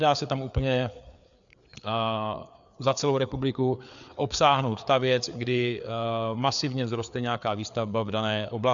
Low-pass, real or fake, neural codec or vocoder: 7.2 kHz; fake; codec, 16 kHz, 4 kbps, FreqCodec, larger model